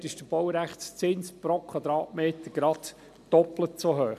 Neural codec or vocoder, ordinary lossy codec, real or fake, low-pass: none; none; real; 14.4 kHz